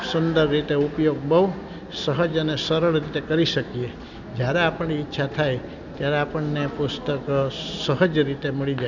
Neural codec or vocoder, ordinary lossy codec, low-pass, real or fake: none; none; 7.2 kHz; real